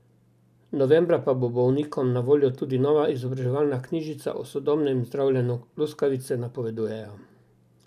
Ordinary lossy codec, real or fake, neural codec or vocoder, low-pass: none; real; none; 14.4 kHz